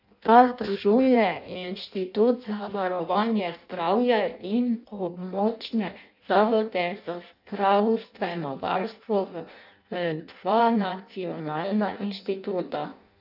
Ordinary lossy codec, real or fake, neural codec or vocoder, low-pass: none; fake; codec, 16 kHz in and 24 kHz out, 0.6 kbps, FireRedTTS-2 codec; 5.4 kHz